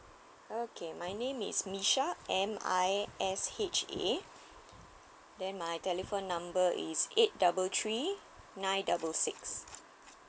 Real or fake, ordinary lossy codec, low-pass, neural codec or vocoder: real; none; none; none